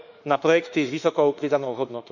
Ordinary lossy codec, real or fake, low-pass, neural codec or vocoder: none; fake; 7.2 kHz; autoencoder, 48 kHz, 32 numbers a frame, DAC-VAE, trained on Japanese speech